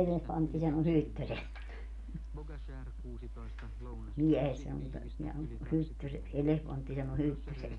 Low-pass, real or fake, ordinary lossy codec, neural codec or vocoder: 10.8 kHz; real; none; none